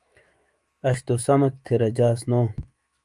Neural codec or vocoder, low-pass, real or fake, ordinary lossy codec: autoencoder, 48 kHz, 128 numbers a frame, DAC-VAE, trained on Japanese speech; 10.8 kHz; fake; Opus, 32 kbps